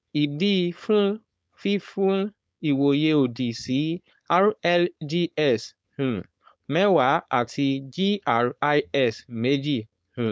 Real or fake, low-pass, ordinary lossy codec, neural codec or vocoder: fake; none; none; codec, 16 kHz, 4.8 kbps, FACodec